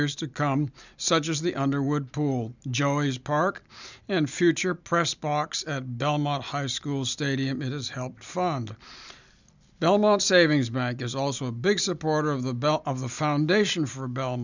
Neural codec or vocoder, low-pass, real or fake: none; 7.2 kHz; real